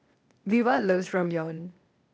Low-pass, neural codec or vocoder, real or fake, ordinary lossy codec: none; codec, 16 kHz, 0.8 kbps, ZipCodec; fake; none